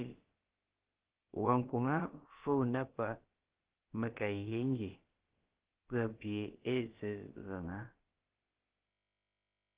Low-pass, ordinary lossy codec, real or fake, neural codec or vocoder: 3.6 kHz; Opus, 16 kbps; fake; codec, 16 kHz, about 1 kbps, DyCAST, with the encoder's durations